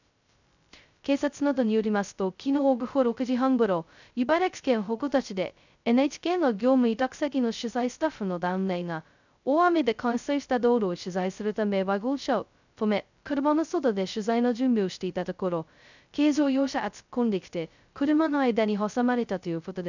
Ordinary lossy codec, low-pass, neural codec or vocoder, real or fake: none; 7.2 kHz; codec, 16 kHz, 0.2 kbps, FocalCodec; fake